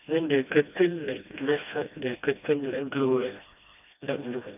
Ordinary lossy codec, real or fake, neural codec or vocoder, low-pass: none; fake; codec, 16 kHz, 1 kbps, FreqCodec, smaller model; 3.6 kHz